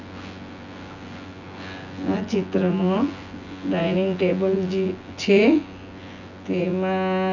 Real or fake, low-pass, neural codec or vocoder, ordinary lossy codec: fake; 7.2 kHz; vocoder, 24 kHz, 100 mel bands, Vocos; none